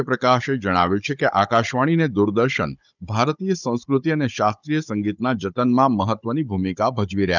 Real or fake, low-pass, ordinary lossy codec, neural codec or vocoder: fake; 7.2 kHz; none; codec, 16 kHz, 16 kbps, FunCodec, trained on Chinese and English, 50 frames a second